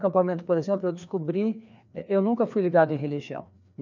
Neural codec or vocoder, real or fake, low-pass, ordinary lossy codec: codec, 16 kHz, 2 kbps, FreqCodec, larger model; fake; 7.2 kHz; none